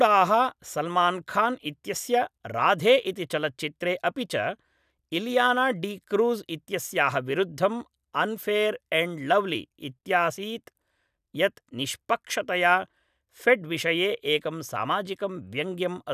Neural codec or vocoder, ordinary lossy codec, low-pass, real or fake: none; none; 14.4 kHz; real